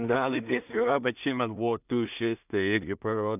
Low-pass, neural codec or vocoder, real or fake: 3.6 kHz; codec, 16 kHz in and 24 kHz out, 0.4 kbps, LongCat-Audio-Codec, two codebook decoder; fake